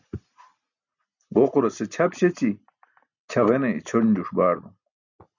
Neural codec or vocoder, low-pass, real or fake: none; 7.2 kHz; real